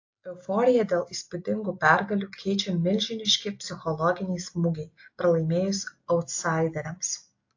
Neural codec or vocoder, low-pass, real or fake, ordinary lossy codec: none; 7.2 kHz; real; AAC, 48 kbps